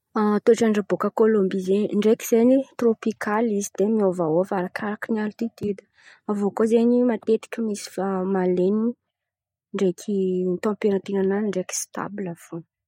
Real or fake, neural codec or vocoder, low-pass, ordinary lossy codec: real; none; 19.8 kHz; MP3, 64 kbps